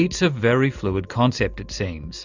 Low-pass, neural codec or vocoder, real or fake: 7.2 kHz; none; real